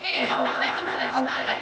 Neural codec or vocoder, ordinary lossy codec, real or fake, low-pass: codec, 16 kHz, 0.8 kbps, ZipCodec; none; fake; none